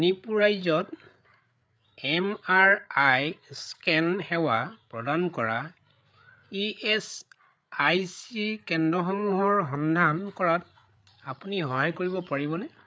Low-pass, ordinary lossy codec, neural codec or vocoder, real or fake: none; none; codec, 16 kHz, 8 kbps, FreqCodec, larger model; fake